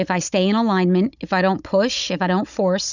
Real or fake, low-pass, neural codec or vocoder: fake; 7.2 kHz; codec, 16 kHz, 16 kbps, FunCodec, trained on Chinese and English, 50 frames a second